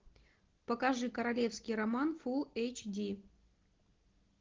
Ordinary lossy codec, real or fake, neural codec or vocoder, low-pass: Opus, 16 kbps; real; none; 7.2 kHz